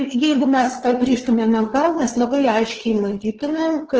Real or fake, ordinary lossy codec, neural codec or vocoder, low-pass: fake; Opus, 16 kbps; codec, 16 kHz, 2 kbps, FunCodec, trained on LibriTTS, 25 frames a second; 7.2 kHz